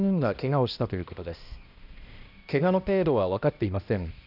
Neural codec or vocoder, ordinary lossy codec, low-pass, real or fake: codec, 16 kHz, 1 kbps, X-Codec, HuBERT features, trained on balanced general audio; none; 5.4 kHz; fake